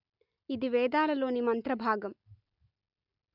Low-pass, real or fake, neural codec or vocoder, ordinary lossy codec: 5.4 kHz; real; none; none